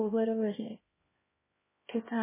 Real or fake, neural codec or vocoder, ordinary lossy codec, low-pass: fake; codec, 16 kHz, 1 kbps, X-Codec, HuBERT features, trained on LibriSpeech; MP3, 16 kbps; 3.6 kHz